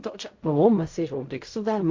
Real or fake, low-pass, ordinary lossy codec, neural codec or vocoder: fake; 7.2 kHz; MP3, 48 kbps; codec, 16 kHz in and 24 kHz out, 0.4 kbps, LongCat-Audio-Codec, fine tuned four codebook decoder